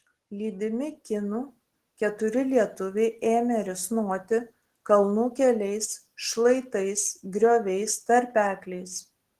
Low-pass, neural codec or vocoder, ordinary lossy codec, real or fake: 14.4 kHz; none; Opus, 16 kbps; real